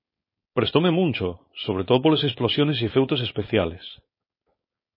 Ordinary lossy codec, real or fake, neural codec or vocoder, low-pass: MP3, 24 kbps; fake; codec, 16 kHz, 4.8 kbps, FACodec; 5.4 kHz